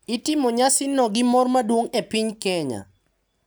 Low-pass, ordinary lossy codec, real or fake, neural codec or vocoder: none; none; real; none